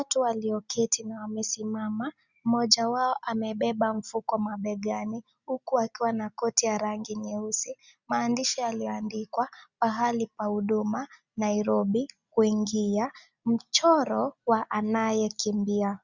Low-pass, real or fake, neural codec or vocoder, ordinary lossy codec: 7.2 kHz; real; none; Opus, 64 kbps